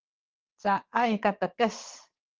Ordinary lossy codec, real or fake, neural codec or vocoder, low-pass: Opus, 16 kbps; fake; vocoder, 22.05 kHz, 80 mel bands, WaveNeXt; 7.2 kHz